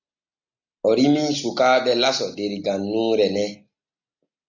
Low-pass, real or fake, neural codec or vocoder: 7.2 kHz; real; none